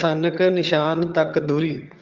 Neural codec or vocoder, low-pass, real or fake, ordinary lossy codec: vocoder, 22.05 kHz, 80 mel bands, HiFi-GAN; 7.2 kHz; fake; Opus, 32 kbps